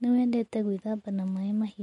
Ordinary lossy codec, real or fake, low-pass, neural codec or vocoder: MP3, 48 kbps; real; 19.8 kHz; none